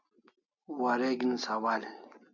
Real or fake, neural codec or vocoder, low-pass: real; none; 7.2 kHz